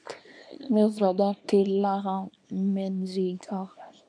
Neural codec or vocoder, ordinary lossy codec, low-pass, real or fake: codec, 24 kHz, 0.9 kbps, WavTokenizer, small release; AAC, 48 kbps; 9.9 kHz; fake